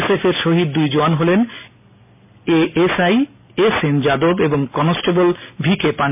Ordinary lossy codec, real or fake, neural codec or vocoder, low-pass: MP3, 32 kbps; real; none; 3.6 kHz